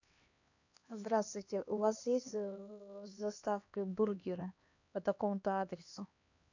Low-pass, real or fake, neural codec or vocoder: 7.2 kHz; fake; codec, 16 kHz, 2 kbps, X-Codec, HuBERT features, trained on LibriSpeech